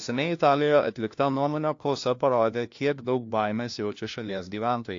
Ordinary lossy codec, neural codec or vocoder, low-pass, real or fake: AAC, 48 kbps; codec, 16 kHz, 1 kbps, FunCodec, trained on LibriTTS, 50 frames a second; 7.2 kHz; fake